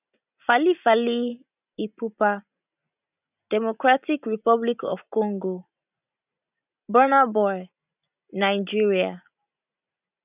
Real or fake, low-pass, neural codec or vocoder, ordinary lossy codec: real; 3.6 kHz; none; none